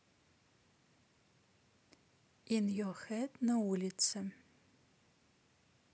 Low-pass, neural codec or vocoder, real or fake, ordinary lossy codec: none; none; real; none